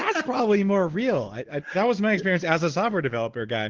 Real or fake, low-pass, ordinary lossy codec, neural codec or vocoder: real; 7.2 kHz; Opus, 16 kbps; none